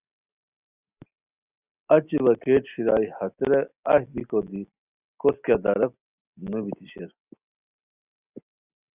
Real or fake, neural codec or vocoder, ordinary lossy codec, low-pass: real; none; Opus, 64 kbps; 3.6 kHz